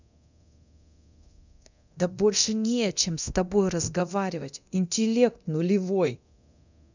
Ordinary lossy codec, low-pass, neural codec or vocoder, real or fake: none; 7.2 kHz; codec, 24 kHz, 0.9 kbps, DualCodec; fake